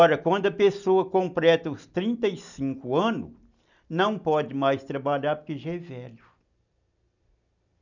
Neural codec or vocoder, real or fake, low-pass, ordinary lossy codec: none; real; 7.2 kHz; none